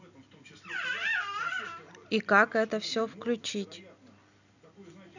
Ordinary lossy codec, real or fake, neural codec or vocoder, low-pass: none; real; none; 7.2 kHz